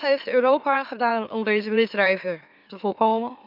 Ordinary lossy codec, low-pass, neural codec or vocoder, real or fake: none; 5.4 kHz; autoencoder, 44.1 kHz, a latent of 192 numbers a frame, MeloTTS; fake